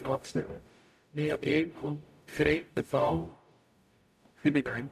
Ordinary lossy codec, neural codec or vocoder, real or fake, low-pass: none; codec, 44.1 kHz, 0.9 kbps, DAC; fake; 14.4 kHz